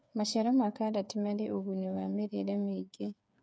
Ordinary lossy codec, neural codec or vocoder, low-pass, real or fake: none; codec, 16 kHz, 8 kbps, FreqCodec, smaller model; none; fake